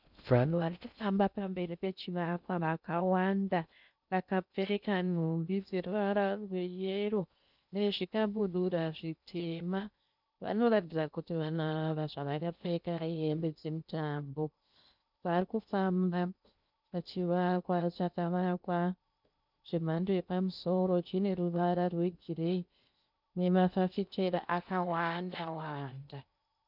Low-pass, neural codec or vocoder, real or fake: 5.4 kHz; codec, 16 kHz in and 24 kHz out, 0.6 kbps, FocalCodec, streaming, 4096 codes; fake